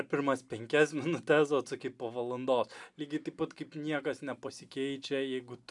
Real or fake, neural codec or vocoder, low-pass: real; none; 10.8 kHz